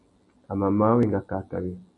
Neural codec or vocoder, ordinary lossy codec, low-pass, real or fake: none; MP3, 48 kbps; 10.8 kHz; real